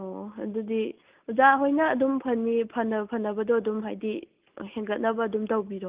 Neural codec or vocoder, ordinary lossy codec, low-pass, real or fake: none; Opus, 32 kbps; 3.6 kHz; real